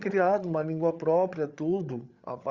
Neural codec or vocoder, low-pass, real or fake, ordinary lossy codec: codec, 16 kHz, 8 kbps, FreqCodec, larger model; 7.2 kHz; fake; Opus, 64 kbps